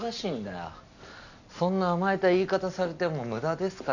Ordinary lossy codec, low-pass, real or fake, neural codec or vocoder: none; 7.2 kHz; real; none